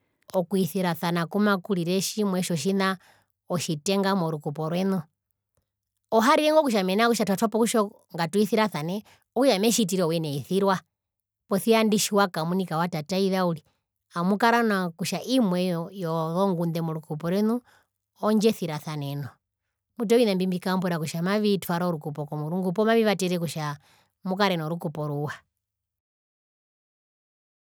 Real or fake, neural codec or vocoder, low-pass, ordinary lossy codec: real; none; none; none